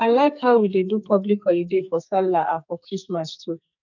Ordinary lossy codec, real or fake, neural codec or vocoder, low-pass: none; fake; codec, 44.1 kHz, 2.6 kbps, SNAC; 7.2 kHz